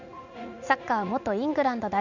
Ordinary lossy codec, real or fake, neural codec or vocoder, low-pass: none; fake; vocoder, 44.1 kHz, 80 mel bands, Vocos; 7.2 kHz